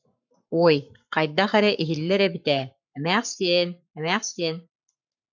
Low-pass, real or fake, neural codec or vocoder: 7.2 kHz; fake; codec, 44.1 kHz, 7.8 kbps, Pupu-Codec